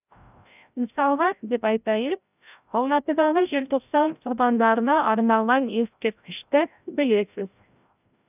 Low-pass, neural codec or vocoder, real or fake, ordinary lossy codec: 3.6 kHz; codec, 16 kHz, 0.5 kbps, FreqCodec, larger model; fake; none